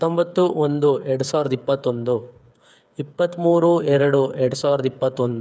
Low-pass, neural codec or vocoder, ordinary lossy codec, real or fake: none; codec, 16 kHz, 8 kbps, FreqCodec, smaller model; none; fake